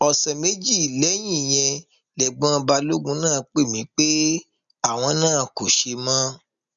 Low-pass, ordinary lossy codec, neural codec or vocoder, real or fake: 7.2 kHz; none; none; real